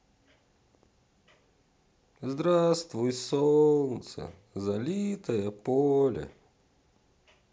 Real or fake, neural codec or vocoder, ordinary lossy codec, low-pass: real; none; none; none